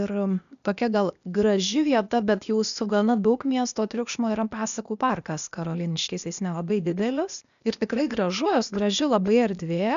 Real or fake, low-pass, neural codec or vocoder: fake; 7.2 kHz; codec, 16 kHz, 0.8 kbps, ZipCodec